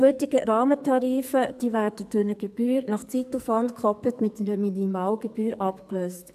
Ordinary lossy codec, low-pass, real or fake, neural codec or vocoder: AAC, 96 kbps; 14.4 kHz; fake; codec, 44.1 kHz, 2.6 kbps, SNAC